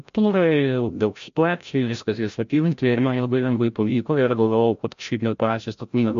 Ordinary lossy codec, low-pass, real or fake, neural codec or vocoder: MP3, 48 kbps; 7.2 kHz; fake; codec, 16 kHz, 0.5 kbps, FreqCodec, larger model